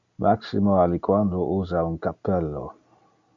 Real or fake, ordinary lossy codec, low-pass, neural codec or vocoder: real; MP3, 64 kbps; 7.2 kHz; none